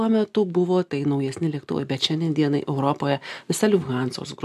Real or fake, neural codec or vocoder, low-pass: real; none; 14.4 kHz